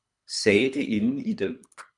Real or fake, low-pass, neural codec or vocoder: fake; 10.8 kHz; codec, 24 kHz, 3 kbps, HILCodec